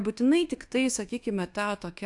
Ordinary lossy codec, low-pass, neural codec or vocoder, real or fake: AAC, 64 kbps; 10.8 kHz; codec, 24 kHz, 1.2 kbps, DualCodec; fake